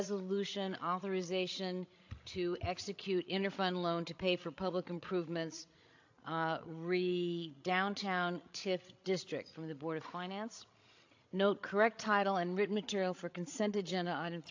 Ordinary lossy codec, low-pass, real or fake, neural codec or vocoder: AAC, 48 kbps; 7.2 kHz; fake; codec, 16 kHz, 16 kbps, FreqCodec, larger model